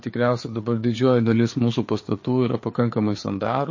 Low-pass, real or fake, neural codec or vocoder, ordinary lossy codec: 7.2 kHz; fake; codec, 16 kHz, 4 kbps, FreqCodec, larger model; MP3, 32 kbps